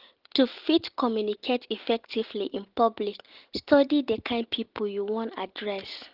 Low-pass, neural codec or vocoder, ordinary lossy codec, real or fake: 5.4 kHz; none; Opus, 16 kbps; real